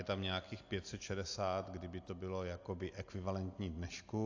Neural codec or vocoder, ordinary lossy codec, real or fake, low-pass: none; AAC, 48 kbps; real; 7.2 kHz